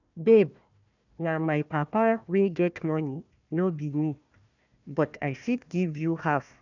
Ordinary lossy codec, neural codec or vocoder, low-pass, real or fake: none; codec, 16 kHz, 1 kbps, FunCodec, trained on Chinese and English, 50 frames a second; 7.2 kHz; fake